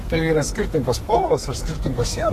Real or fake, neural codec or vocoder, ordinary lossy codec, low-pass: fake; codec, 32 kHz, 1.9 kbps, SNAC; AAC, 48 kbps; 14.4 kHz